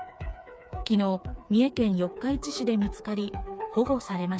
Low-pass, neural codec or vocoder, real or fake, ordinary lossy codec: none; codec, 16 kHz, 4 kbps, FreqCodec, smaller model; fake; none